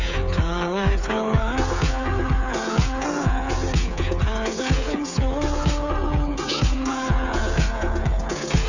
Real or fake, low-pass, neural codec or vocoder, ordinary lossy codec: fake; 7.2 kHz; codec, 16 kHz, 8 kbps, FreqCodec, smaller model; none